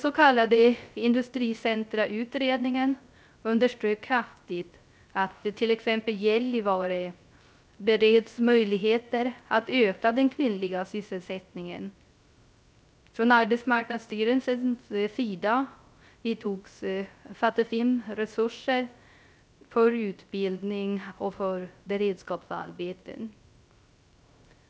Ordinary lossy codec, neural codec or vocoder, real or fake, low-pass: none; codec, 16 kHz, 0.3 kbps, FocalCodec; fake; none